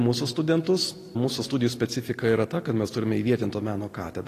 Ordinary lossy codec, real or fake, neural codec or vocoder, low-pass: AAC, 48 kbps; real; none; 14.4 kHz